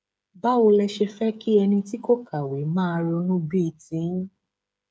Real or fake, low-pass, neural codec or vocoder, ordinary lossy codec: fake; none; codec, 16 kHz, 8 kbps, FreqCodec, smaller model; none